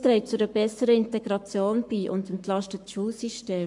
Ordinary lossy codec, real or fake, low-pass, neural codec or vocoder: MP3, 64 kbps; fake; 10.8 kHz; codec, 44.1 kHz, 7.8 kbps, Pupu-Codec